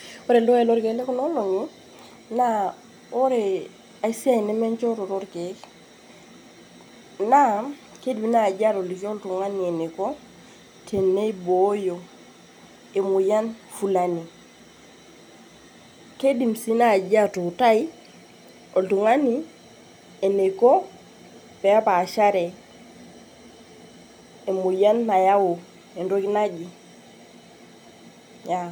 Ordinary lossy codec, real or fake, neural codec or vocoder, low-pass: none; real; none; none